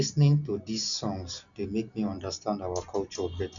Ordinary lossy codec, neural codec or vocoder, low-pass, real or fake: none; none; 7.2 kHz; real